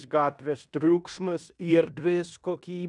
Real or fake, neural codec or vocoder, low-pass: fake; codec, 16 kHz in and 24 kHz out, 0.9 kbps, LongCat-Audio-Codec, fine tuned four codebook decoder; 10.8 kHz